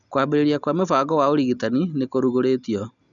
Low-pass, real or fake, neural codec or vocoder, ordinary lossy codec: 7.2 kHz; real; none; none